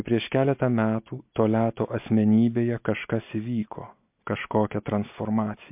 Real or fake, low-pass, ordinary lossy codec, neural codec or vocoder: real; 3.6 kHz; MP3, 32 kbps; none